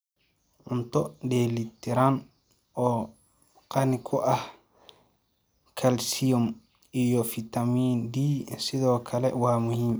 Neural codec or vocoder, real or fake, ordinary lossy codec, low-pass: none; real; none; none